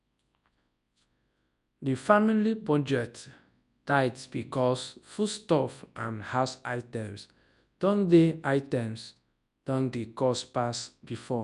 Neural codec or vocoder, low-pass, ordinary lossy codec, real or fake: codec, 24 kHz, 0.9 kbps, WavTokenizer, large speech release; 10.8 kHz; none; fake